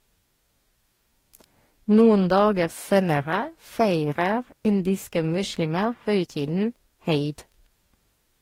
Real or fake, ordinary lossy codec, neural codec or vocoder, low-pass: fake; AAC, 48 kbps; codec, 44.1 kHz, 2.6 kbps, DAC; 19.8 kHz